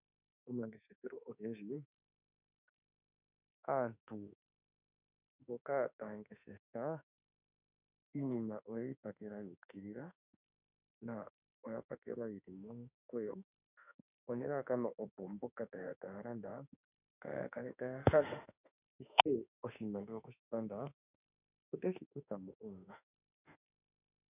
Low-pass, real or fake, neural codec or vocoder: 3.6 kHz; fake; autoencoder, 48 kHz, 32 numbers a frame, DAC-VAE, trained on Japanese speech